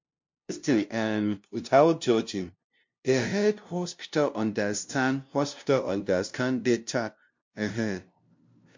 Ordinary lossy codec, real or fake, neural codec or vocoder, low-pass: MP3, 48 kbps; fake; codec, 16 kHz, 0.5 kbps, FunCodec, trained on LibriTTS, 25 frames a second; 7.2 kHz